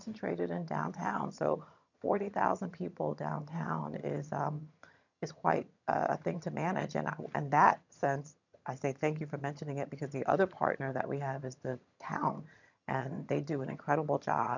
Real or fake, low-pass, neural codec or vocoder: fake; 7.2 kHz; vocoder, 22.05 kHz, 80 mel bands, HiFi-GAN